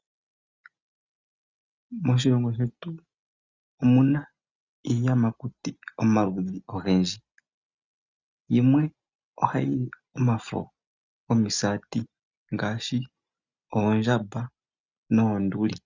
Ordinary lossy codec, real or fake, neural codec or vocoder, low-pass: Opus, 64 kbps; real; none; 7.2 kHz